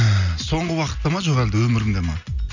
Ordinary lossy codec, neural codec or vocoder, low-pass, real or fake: none; none; 7.2 kHz; real